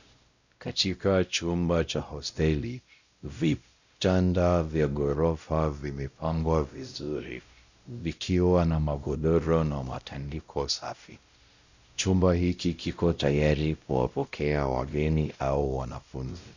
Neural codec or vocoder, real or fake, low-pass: codec, 16 kHz, 0.5 kbps, X-Codec, WavLM features, trained on Multilingual LibriSpeech; fake; 7.2 kHz